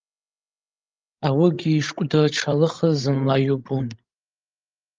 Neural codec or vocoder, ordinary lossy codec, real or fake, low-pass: codec, 16 kHz, 16 kbps, FreqCodec, larger model; Opus, 32 kbps; fake; 7.2 kHz